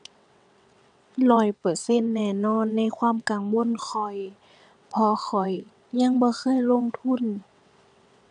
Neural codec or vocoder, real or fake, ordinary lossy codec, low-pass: vocoder, 22.05 kHz, 80 mel bands, WaveNeXt; fake; none; 9.9 kHz